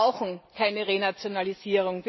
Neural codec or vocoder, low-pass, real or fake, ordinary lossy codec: vocoder, 44.1 kHz, 128 mel bands every 256 samples, BigVGAN v2; 7.2 kHz; fake; MP3, 24 kbps